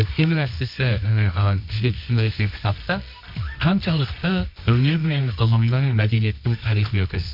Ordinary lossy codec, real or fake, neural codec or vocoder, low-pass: MP3, 48 kbps; fake; codec, 24 kHz, 0.9 kbps, WavTokenizer, medium music audio release; 5.4 kHz